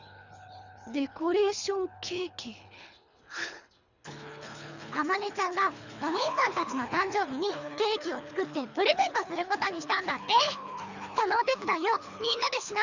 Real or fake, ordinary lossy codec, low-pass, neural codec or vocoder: fake; none; 7.2 kHz; codec, 24 kHz, 3 kbps, HILCodec